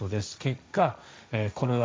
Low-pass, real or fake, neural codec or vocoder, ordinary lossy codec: none; fake; codec, 16 kHz, 1.1 kbps, Voila-Tokenizer; none